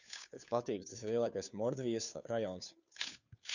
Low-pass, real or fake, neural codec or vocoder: 7.2 kHz; fake; codec, 16 kHz, 2 kbps, FunCodec, trained on Chinese and English, 25 frames a second